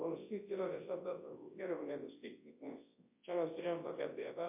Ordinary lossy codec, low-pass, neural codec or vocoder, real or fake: MP3, 24 kbps; 3.6 kHz; codec, 24 kHz, 0.9 kbps, WavTokenizer, large speech release; fake